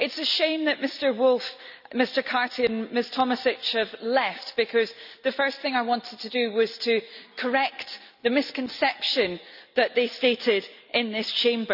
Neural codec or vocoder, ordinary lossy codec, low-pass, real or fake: none; none; 5.4 kHz; real